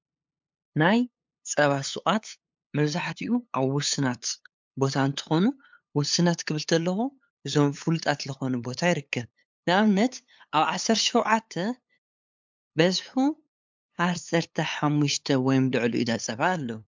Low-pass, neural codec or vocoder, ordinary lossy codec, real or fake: 7.2 kHz; codec, 16 kHz, 8 kbps, FunCodec, trained on LibriTTS, 25 frames a second; MP3, 64 kbps; fake